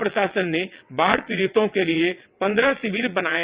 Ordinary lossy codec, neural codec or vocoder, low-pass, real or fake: Opus, 64 kbps; vocoder, 22.05 kHz, 80 mel bands, WaveNeXt; 3.6 kHz; fake